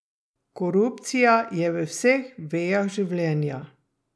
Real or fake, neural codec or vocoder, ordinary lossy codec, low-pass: real; none; none; none